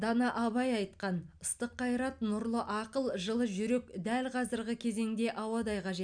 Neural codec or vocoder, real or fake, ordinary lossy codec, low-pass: none; real; none; 9.9 kHz